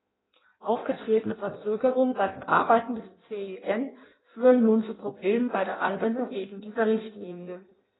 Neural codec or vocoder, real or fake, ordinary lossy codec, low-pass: codec, 16 kHz in and 24 kHz out, 0.6 kbps, FireRedTTS-2 codec; fake; AAC, 16 kbps; 7.2 kHz